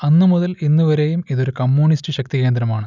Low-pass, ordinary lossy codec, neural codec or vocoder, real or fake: 7.2 kHz; none; none; real